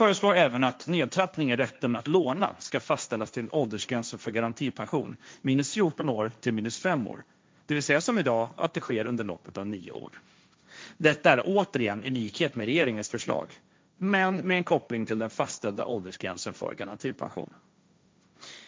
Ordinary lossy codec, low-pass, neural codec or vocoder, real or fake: none; none; codec, 16 kHz, 1.1 kbps, Voila-Tokenizer; fake